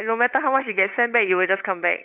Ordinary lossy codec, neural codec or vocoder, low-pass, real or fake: none; codec, 16 kHz, 8 kbps, FunCodec, trained on LibriTTS, 25 frames a second; 3.6 kHz; fake